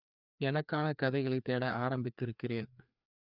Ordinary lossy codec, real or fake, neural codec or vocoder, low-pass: none; fake; codec, 16 kHz, 2 kbps, FreqCodec, larger model; 5.4 kHz